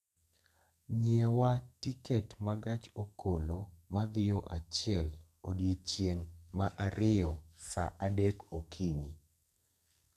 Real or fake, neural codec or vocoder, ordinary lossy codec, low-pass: fake; codec, 44.1 kHz, 2.6 kbps, SNAC; none; 14.4 kHz